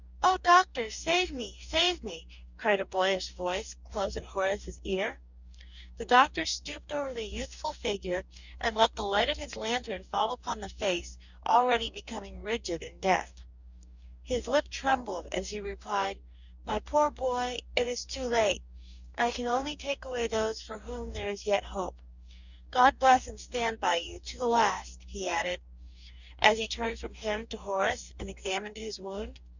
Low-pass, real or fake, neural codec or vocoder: 7.2 kHz; fake; codec, 44.1 kHz, 2.6 kbps, DAC